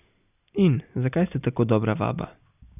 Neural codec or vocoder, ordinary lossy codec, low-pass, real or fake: none; none; 3.6 kHz; real